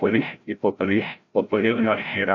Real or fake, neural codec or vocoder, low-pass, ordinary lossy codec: fake; codec, 16 kHz, 0.5 kbps, FreqCodec, larger model; 7.2 kHz; AAC, 48 kbps